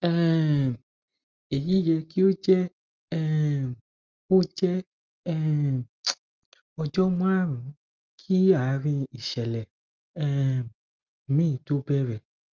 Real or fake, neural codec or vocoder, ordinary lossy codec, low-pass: real; none; Opus, 24 kbps; 7.2 kHz